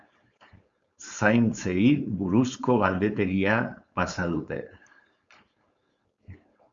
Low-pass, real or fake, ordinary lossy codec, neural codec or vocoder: 7.2 kHz; fake; Opus, 64 kbps; codec, 16 kHz, 4.8 kbps, FACodec